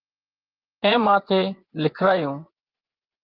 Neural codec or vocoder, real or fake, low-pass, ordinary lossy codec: vocoder, 24 kHz, 100 mel bands, Vocos; fake; 5.4 kHz; Opus, 32 kbps